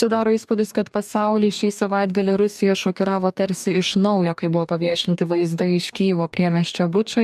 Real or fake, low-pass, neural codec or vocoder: fake; 14.4 kHz; codec, 44.1 kHz, 2.6 kbps, DAC